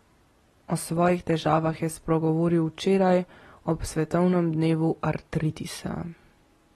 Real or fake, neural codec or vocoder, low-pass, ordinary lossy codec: real; none; 19.8 kHz; AAC, 32 kbps